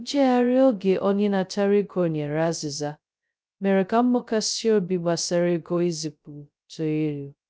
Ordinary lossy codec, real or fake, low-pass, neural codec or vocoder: none; fake; none; codec, 16 kHz, 0.2 kbps, FocalCodec